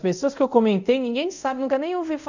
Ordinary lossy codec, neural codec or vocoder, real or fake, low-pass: none; codec, 24 kHz, 0.5 kbps, DualCodec; fake; 7.2 kHz